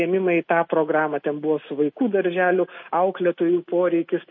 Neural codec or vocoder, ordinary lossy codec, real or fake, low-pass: none; MP3, 32 kbps; real; 7.2 kHz